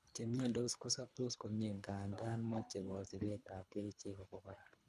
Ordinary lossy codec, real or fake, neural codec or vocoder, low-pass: none; fake; codec, 24 kHz, 3 kbps, HILCodec; none